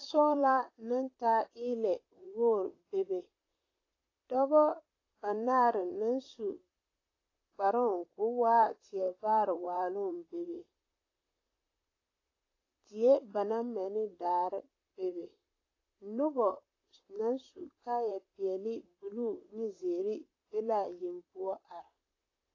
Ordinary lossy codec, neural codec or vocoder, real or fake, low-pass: AAC, 32 kbps; vocoder, 44.1 kHz, 128 mel bands, Pupu-Vocoder; fake; 7.2 kHz